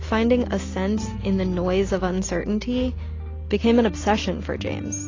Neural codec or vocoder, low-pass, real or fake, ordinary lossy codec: none; 7.2 kHz; real; AAC, 32 kbps